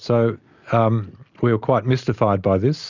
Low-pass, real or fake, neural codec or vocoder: 7.2 kHz; real; none